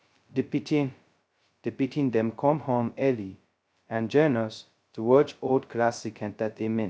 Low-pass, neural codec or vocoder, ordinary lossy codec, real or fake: none; codec, 16 kHz, 0.2 kbps, FocalCodec; none; fake